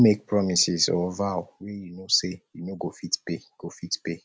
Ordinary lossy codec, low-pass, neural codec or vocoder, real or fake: none; none; none; real